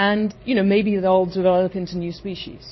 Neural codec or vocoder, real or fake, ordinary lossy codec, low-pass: vocoder, 44.1 kHz, 128 mel bands every 256 samples, BigVGAN v2; fake; MP3, 24 kbps; 7.2 kHz